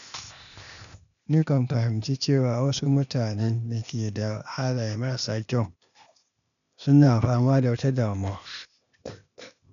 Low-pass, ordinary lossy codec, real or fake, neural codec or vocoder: 7.2 kHz; none; fake; codec, 16 kHz, 0.8 kbps, ZipCodec